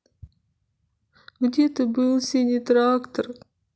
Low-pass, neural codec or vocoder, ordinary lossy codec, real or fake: none; none; none; real